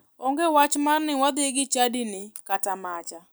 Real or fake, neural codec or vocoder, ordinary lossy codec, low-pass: real; none; none; none